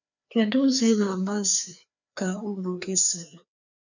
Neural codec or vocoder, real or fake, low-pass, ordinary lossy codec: codec, 16 kHz, 2 kbps, FreqCodec, larger model; fake; 7.2 kHz; none